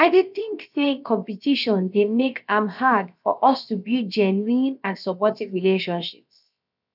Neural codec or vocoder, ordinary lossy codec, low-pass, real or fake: codec, 16 kHz, about 1 kbps, DyCAST, with the encoder's durations; none; 5.4 kHz; fake